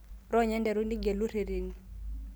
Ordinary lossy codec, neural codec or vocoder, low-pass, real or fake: none; none; none; real